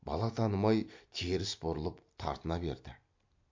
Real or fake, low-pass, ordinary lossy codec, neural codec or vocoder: fake; 7.2 kHz; MP3, 48 kbps; vocoder, 44.1 kHz, 128 mel bands every 256 samples, BigVGAN v2